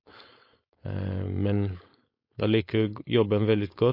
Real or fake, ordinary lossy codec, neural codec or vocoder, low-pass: fake; MP3, 32 kbps; codec, 16 kHz, 4.8 kbps, FACodec; 5.4 kHz